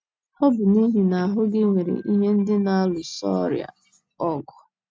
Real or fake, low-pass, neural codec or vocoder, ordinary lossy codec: real; none; none; none